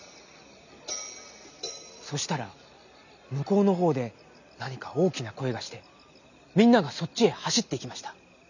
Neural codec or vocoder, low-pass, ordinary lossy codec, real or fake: none; 7.2 kHz; none; real